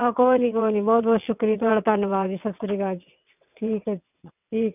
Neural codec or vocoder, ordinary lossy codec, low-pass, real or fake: vocoder, 22.05 kHz, 80 mel bands, WaveNeXt; none; 3.6 kHz; fake